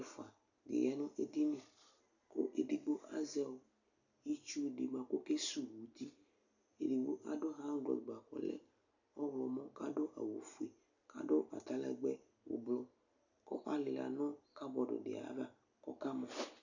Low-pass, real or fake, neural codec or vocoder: 7.2 kHz; real; none